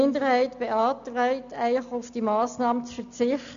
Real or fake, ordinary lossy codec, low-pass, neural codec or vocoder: real; none; 7.2 kHz; none